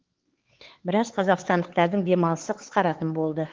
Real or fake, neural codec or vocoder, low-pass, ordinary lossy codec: fake; codec, 16 kHz, 4 kbps, X-Codec, HuBERT features, trained on LibriSpeech; 7.2 kHz; Opus, 16 kbps